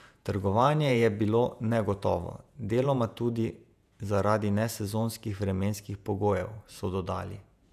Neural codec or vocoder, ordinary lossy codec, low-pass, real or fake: vocoder, 48 kHz, 128 mel bands, Vocos; none; 14.4 kHz; fake